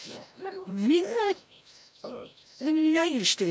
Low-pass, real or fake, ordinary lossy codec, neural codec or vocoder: none; fake; none; codec, 16 kHz, 0.5 kbps, FreqCodec, larger model